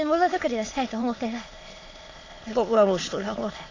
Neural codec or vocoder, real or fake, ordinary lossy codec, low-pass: autoencoder, 22.05 kHz, a latent of 192 numbers a frame, VITS, trained on many speakers; fake; AAC, 32 kbps; 7.2 kHz